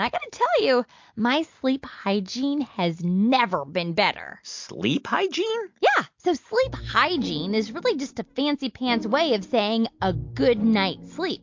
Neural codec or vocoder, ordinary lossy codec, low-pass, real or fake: none; MP3, 48 kbps; 7.2 kHz; real